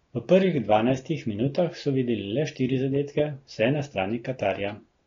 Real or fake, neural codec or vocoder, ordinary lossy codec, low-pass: real; none; AAC, 32 kbps; 7.2 kHz